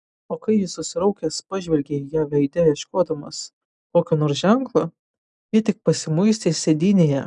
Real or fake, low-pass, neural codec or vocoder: real; 10.8 kHz; none